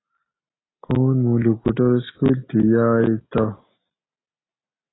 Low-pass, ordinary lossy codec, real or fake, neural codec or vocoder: 7.2 kHz; AAC, 16 kbps; real; none